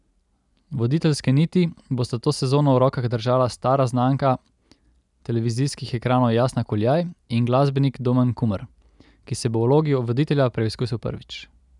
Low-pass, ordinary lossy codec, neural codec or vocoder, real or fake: 10.8 kHz; none; none; real